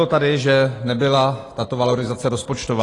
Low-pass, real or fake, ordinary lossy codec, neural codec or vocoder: 10.8 kHz; real; AAC, 32 kbps; none